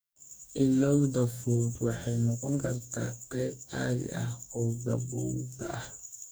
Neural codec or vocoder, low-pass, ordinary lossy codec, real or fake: codec, 44.1 kHz, 2.6 kbps, DAC; none; none; fake